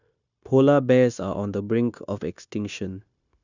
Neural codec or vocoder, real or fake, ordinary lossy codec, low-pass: codec, 16 kHz, 0.9 kbps, LongCat-Audio-Codec; fake; none; 7.2 kHz